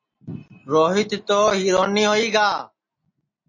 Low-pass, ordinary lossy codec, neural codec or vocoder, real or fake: 7.2 kHz; MP3, 32 kbps; none; real